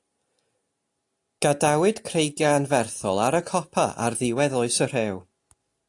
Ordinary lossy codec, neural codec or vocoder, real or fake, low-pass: AAC, 64 kbps; none; real; 10.8 kHz